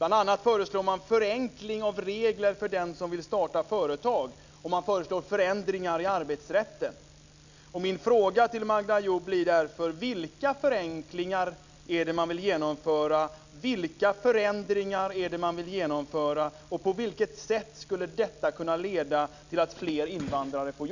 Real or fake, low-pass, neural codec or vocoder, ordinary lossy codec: real; 7.2 kHz; none; none